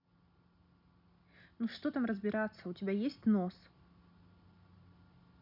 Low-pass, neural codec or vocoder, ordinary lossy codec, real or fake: 5.4 kHz; none; none; real